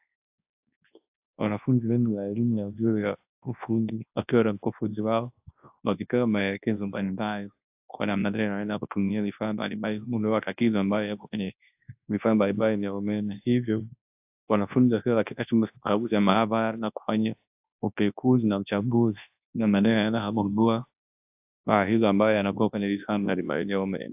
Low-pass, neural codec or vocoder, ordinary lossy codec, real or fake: 3.6 kHz; codec, 24 kHz, 0.9 kbps, WavTokenizer, large speech release; AAC, 32 kbps; fake